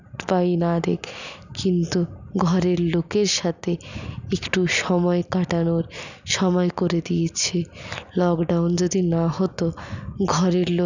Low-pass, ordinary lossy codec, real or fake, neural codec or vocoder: 7.2 kHz; none; real; none